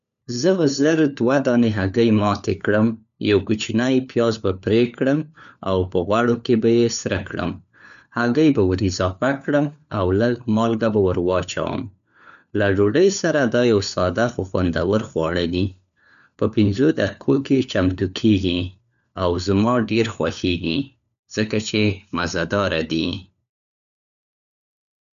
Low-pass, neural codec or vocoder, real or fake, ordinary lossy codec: 7.2 kHz; codec, 16 kHz, 4 kbps, FunCodec, trained on LibriTTS, 50 frames a second; fake; none